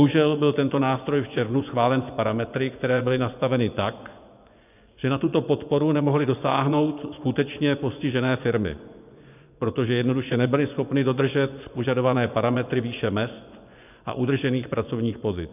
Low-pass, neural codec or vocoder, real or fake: 3.6 kHz; vocoder, 24 kHz, 100 mel bands, Vocos; fake